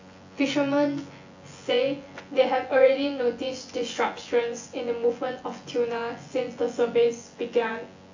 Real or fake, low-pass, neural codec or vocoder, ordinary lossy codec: fake; 7.2 kHz; vocoder, 24 kHz, 100 mel bands, Vocos; AAC, 48 kbps